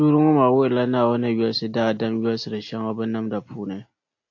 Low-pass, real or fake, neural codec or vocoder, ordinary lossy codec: 7.2 kHz; real; none; AAC, 48 kbps